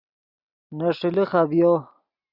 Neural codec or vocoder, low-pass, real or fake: none; 5.4 kHz; real